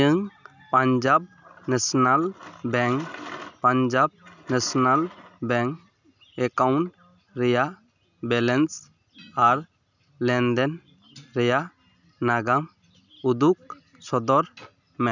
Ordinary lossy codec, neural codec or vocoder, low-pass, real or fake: none; none; 7.2 kHz; real